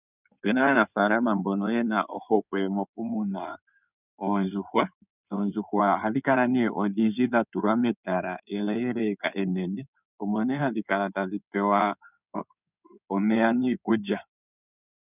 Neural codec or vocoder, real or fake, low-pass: codec, 16 kHz in and 24 kHz out, 2.2 kbps, FireRedTTS-2 codec; fake; 3.6 kHz